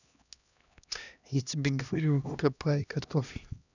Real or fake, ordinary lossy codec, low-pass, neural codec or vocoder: fake; none; 7.2 kHz; codec, 16 kHz, 1 kbps, X-Codec, HuBERT features, trained on LibriSpeech